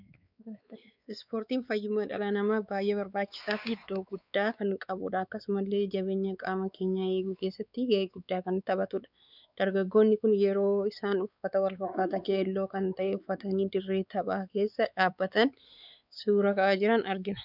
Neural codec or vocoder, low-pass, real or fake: codec, 16 kHz, 4 kbps, X-Codec, WavLM features, trained on Multilingual LibriSpeech; 5.4 kHz; fake